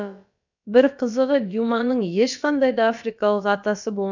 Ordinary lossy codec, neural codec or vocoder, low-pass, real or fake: none; codec, 16 kHz, about 1 kbps, DyCAST, with the encoder's durations; 7.2 kHz; fake